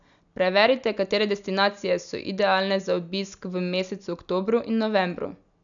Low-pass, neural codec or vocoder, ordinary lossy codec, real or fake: 7.2 kHz; none; none; real